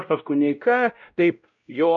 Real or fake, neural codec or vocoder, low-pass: fake; codec, 16 kHz, 0.5 kbps, X-Codec, WavLM features, trained on Multilingual LibriSpeech; 7.2 kHz